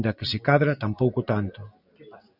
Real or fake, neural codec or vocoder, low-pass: real; none; 5.4 kHz